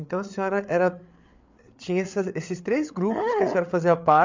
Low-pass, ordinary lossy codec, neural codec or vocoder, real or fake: 7.2 kHz; MP3, 64 kbps; codec, 16 kHz, 8 kbps, FreqCodec, larger model; fake